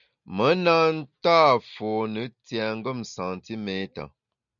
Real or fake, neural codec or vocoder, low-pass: real; none; 7.2 kHz